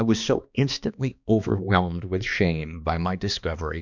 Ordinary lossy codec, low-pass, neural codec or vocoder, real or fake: MP3, 64 kbps; 7.2 kHz; codec, 16 kHz, 2 kbps, X-Codec, HuBERT features, trained on balanced general audio; fake